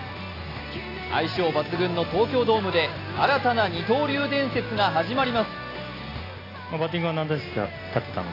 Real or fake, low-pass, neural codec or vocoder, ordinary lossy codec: real; 5.4 kHz; none; AAC, 24 kbps